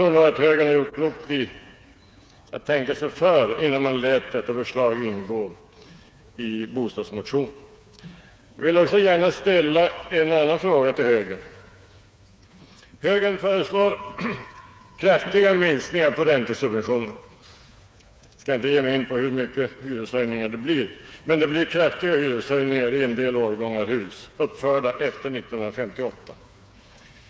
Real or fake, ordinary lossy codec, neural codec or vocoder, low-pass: fake; none; codec, 16 kHz, 4 kbps, FreqCodec, smaller model; none